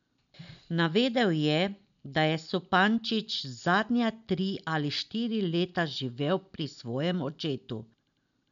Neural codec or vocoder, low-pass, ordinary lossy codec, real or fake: none; 7.2 kHz; MP3, 96 kbps; real